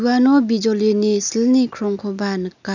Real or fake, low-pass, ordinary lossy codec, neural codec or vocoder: real; 7.2 kHz; none; none